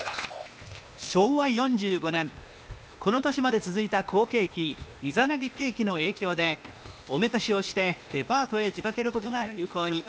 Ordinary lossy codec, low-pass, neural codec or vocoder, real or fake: none; none; codec, 16 kHz, 0.8 kbps, ZipCodec; fake